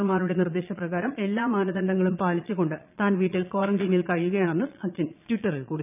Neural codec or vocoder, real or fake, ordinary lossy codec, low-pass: vocoder, 22.05 kHz, 80 mel bands, Vocos; fake; none; 3.6 kHz